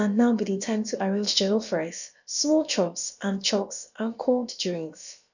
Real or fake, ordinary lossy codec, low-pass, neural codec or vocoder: fake; none; 7.2 kHz; codec, 16 kHz, about 1 kbps, DyCAST, with the encoder's durations